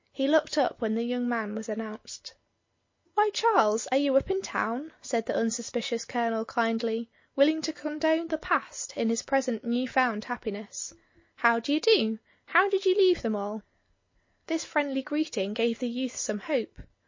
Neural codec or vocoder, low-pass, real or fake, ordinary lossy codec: none; 7.2 kHz; real; MP3, 32 kbps